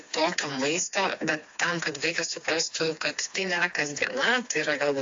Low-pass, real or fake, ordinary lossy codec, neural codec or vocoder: 7.2 kHz; fake; AAC, 48 kbps; codec, 16 kHz, 2 kbps, FreqCodec, smaller model